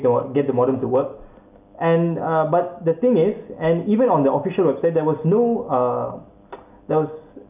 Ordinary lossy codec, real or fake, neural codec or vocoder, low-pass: none; real; none; 3.6 kHz